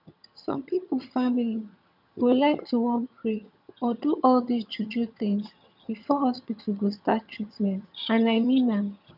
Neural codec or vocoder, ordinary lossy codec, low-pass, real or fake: vocoder, 22.05 kHz, 80 mel bands, HiFi-GAN; none; 5.4 kHz; fake